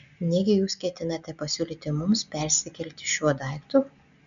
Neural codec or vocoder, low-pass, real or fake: none; 7.2 kHz; real